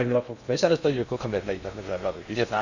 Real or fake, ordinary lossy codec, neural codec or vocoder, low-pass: fake; none; codec, 16 kHz in and 24 kHz out, 0.6 kbps, FocalCodec, streaming, 2048 codes; 7.2 kHz